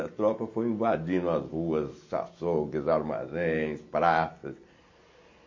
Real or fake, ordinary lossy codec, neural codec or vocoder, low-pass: real; MP3, 32 kbps; none; 7.2 kHz